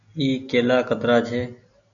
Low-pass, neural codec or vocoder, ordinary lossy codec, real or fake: 7.2 kHz; none; MP3, 96 kbps; real